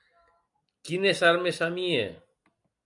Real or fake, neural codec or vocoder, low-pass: real; none; 10.8 kHz